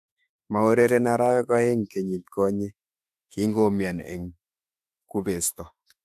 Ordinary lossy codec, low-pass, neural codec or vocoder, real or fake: AAC, 96 kbps; 14.4 kHz; autoencoder, 48 kHz, 32 numbers a frame, DAC-VAE, trained on Japanese speech; fake